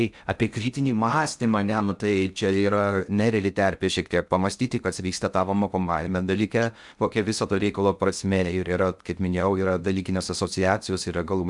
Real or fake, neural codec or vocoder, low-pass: fake; codec, 16 kHz in and 24 kHz out, 0.6 kbps, FocalCodec, streaming, 2048 codes; 10.8 kHz